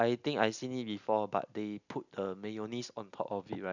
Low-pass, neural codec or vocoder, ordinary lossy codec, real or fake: 7.2 kHz; none; none; real